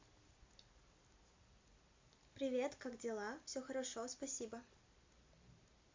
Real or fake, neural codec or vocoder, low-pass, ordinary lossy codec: real; none; 7.2 kHz; MP3, 48 kbps